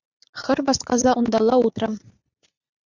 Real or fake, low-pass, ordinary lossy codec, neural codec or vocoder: fake; 7.2 kHz; Opus, 64 kbps; vocoder, 44.1 kHz, 80 mel bands, Vocos